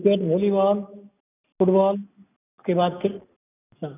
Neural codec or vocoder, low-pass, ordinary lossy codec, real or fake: none; 3.6 kHz; none; real